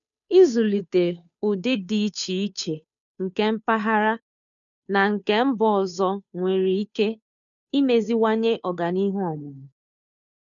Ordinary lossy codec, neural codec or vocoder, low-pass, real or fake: none; codec, 16 kHz, 2 kbps, FunCodec, trained on Chinese and English, 25 frames a second; 7.2 kHz; fake